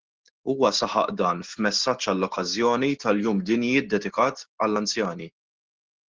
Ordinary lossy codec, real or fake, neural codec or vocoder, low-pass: Opus, 16 kbps; real; none; 7.2 kHz